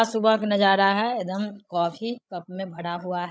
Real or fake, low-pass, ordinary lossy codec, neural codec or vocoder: fake; none; none; codec, 16 kHz, 16 kbps, FreqCodec, larger model